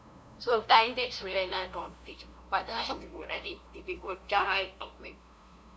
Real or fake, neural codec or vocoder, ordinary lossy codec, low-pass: fake; codec, 16 kHz, 0.5 kbps, FunCodec, trained on LibriTTS, 25 frames a second; none; none